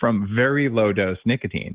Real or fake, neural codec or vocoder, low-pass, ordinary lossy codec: real; none; 3.6 kHz; Opus, 16 kbps